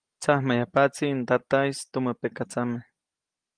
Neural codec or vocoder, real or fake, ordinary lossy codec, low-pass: none; real; Opus, 24 kbps; 9.9 kHz